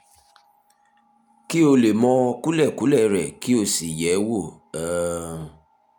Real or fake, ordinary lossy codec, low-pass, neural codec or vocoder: real; none; 19.8 kHz; none